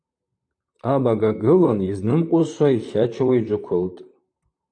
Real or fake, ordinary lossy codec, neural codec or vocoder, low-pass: fake; AAC, 64 kbps; vocoder, 44.1 kHz, 128 mel bands, Pupu-Vocoder; 9.9 kHz